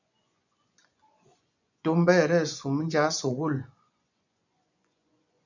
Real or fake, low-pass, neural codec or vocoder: fake; 7.2 kHz; vocoder, 24 kHz, 100 mel bands, Vocos